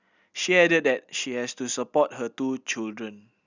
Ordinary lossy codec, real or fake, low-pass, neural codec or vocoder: Opus, 64 kbps; real; 7.2 kHz; none